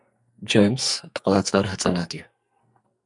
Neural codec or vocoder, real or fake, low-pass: codec, 44.1 kHz, 2.6 kbps, SNAC; fake; 10.8 kHz